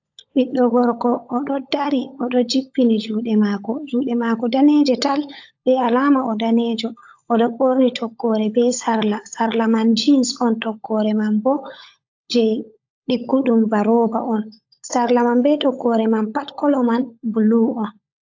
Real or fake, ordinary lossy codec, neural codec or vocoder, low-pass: fake; AAC, 48 kbps; codec, 16 kHz, 16 kbps, FunCodec, trained on LibriTTS, 50 frames a second; 7.2 kHz